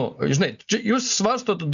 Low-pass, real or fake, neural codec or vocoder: 7.2 kHz; real; none